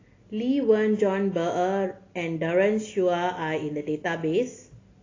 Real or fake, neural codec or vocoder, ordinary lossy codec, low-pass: real; none; AAC, 32 kbps; 7.2 kHz